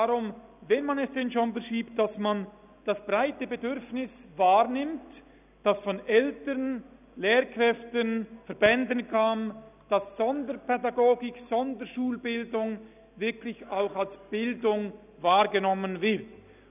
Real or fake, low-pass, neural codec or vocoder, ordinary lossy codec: real; 3.6 kHz; none; none